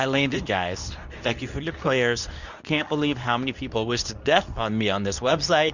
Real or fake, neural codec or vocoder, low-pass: fake; codec, 24 kHz, 0.9 kbps, WavTokenizer, medium speech release version 2; 7.2 kHz